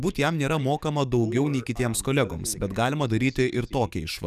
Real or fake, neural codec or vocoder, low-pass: fake; codec, 44.1 kHz, 7.8 kbps, DAC; 14.4 kHz